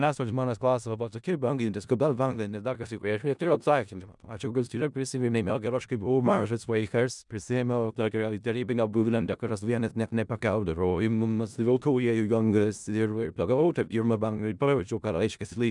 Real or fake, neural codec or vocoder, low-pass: fake; codec, 16 kHz in and 24 kHz out, 0.4 kbps, LongCat-Audio-Codec, four codebook decoder; 10.8 kHz